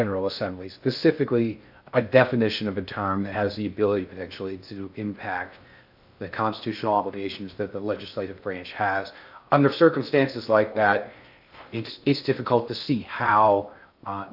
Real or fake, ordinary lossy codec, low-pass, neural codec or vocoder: fake; AAC, 48 kbps; 5.4 kHz; codec, 16 kHz in and 24 kHz out, 0.6 kbps, FocalCodec, streaming, 2048 codes